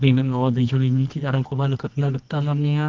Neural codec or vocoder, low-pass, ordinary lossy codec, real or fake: codec, 24 kHz, 0.9 kbps, WavTokenizer, medium music audio release; 7.2 kHz; Opus, 24 kbps; fake